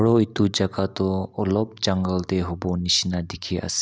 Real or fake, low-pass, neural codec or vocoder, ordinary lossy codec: real; none; none; none